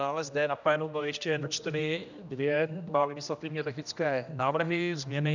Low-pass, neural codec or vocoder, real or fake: 7.2 kHz; codec, 16 kHz, 1 kbps, X-Codec, HuBERT features, trained on general audio; fake